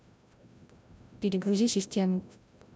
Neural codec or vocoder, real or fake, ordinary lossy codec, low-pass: codec, 16 kHz, 0.5 kbps, FreqCodec, larger model; fake; none; none